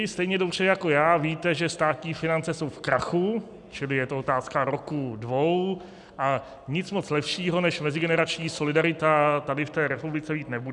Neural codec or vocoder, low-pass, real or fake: none; 10.8 kHz; real